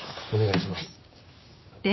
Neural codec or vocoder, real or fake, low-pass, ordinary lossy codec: codec, 16 kHz, 6 kbps, DAC; fake; 7.2 kHz; MP3, 24 kbps